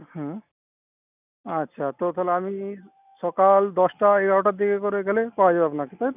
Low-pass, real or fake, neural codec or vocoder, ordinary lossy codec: 3.6 kHz; real; none; none